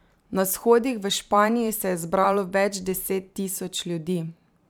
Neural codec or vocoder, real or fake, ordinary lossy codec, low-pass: vocoder, 44.1 kHz, 128 mel bands every 512 samples, BigVGAN v2; fake; none; none